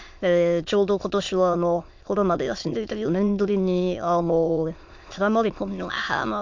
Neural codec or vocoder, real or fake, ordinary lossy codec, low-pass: autoencoder, 22.05 kHz, a latent of 192 numbers a frame, VITS, trained on many speakers; fake; MP3, 48 kbps; 7.2 kHz